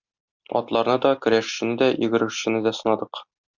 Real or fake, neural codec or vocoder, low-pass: real; none; 7.2 kHz